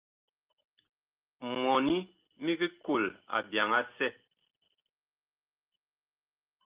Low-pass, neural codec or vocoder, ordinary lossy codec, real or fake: 3.6 kHz; none; Opus, 32 kbps; real